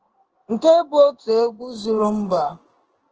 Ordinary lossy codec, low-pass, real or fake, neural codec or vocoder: Opus, 16 kbps; 7.2 kHz; fake; codec, 16 kHz in and 24 kHz out, 1 kbps, XY-Tokenizer